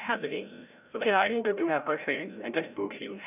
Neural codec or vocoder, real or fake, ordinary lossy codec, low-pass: codec, 16 kHz, 0.5 kbps, FreqCodec, larger model; fake; none; 3.6 kHz